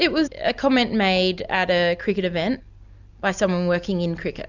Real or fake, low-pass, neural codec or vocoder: real; 7.2 kHz; none